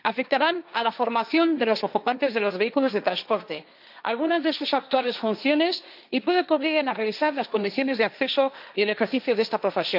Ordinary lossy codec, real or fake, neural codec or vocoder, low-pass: none; fake; codec, 16 kHz, 1.1 kbps, Voila-Tokenizer; 5.4 kHz